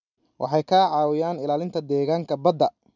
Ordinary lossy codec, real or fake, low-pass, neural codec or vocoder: none; real; 7.2 kHz; none